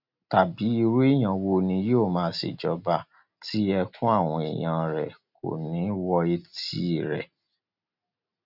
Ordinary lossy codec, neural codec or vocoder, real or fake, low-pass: none; none; real; 5.4 kHz